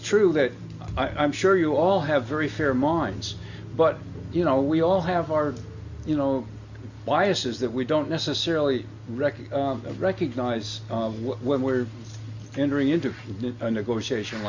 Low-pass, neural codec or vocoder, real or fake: 7.2 kHz; none; real